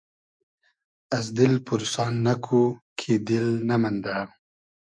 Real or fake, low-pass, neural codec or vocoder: fake; 9.9 kHz; autoencoder, 48 kHz, 128 numbers a frame, DAC-VAE, trained on Japanese speech